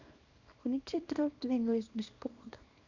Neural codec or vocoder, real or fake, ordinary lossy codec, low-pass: codec, 24 kHz, 0.9 kbps, WavTokenizer, small release; fake; none; 7.2 kHz